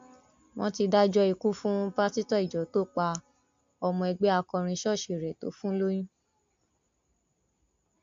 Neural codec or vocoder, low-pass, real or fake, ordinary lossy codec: none; 7.2 kHz; real; MP3, 48 kbps